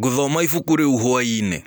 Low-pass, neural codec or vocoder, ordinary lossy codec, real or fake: none; none; none; real